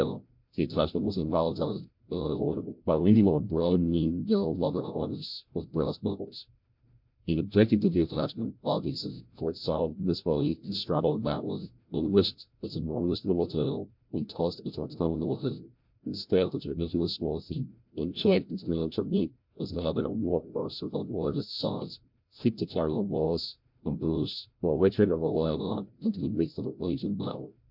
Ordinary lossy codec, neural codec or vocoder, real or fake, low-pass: MP3, 48 kbps; codec, 16 kHz, 0.5 kbps, FreqCodec, larger model; fake; 5.4 kHz